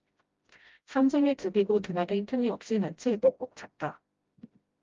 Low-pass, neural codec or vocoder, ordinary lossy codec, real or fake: 7.2 kHz; codec, 16 kHz, 0.5 kbps, FreqCodec, smaller model; Opus, 32 kbps; fake